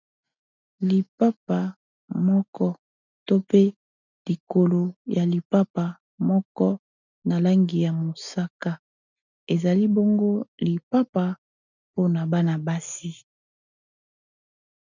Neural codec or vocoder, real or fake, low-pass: none; real; 7.2 kHz